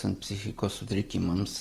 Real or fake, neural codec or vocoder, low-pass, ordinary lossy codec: real; none; 14.4 kHz; Opus, 32 kbps